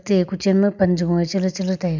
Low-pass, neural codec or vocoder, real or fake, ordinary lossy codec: 7.2 kHz; none; real; none